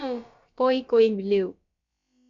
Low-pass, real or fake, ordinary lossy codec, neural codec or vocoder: 7.2 kHz; fake; MP3, 64 kbps; codec, 16 kHz, about 1 kbps, DyCAST, with the encoder's durations